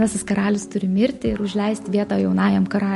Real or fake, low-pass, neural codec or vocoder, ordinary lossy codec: real; 14.4 kHz; none; MP3, 48 kbps